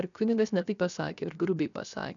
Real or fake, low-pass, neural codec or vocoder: fake; 7.2 kHz; codec, 16 kHz, 0.7 kbps, FocalCodec